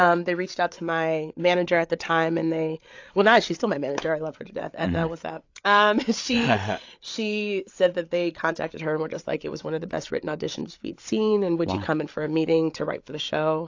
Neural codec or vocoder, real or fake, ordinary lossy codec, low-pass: codec, 16 kHz, 8 kbps, FreqCodec, larger model; fake; AAC, 48 kbps; 7.2 kHz